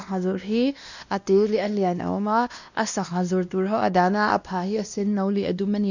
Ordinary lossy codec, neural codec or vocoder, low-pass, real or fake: none; codec, 16 kHz, 1 kbps, X-Codec, WavLM features, trained on Multilingual LibriSpeech; 7.2 kHz; fake